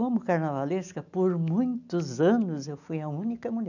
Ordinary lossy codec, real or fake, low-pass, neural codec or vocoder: none; real; 7.2 kHz; none